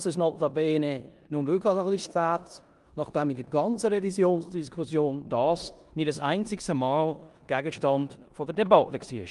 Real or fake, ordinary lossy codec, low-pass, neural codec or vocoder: fake; Opus, 32 kbps; 10.8 kHz; codec, 16 kHz in and 24 kHz out, 0.9 kbps, LongCat-Audio-Codec, four codebook decoder